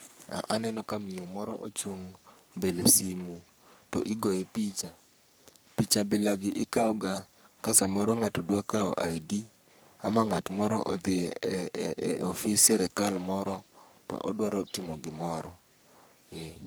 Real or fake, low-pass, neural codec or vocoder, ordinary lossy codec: fake; none; codec, 44.1 kHz, 3.4 kbps, Pupu-Codec; none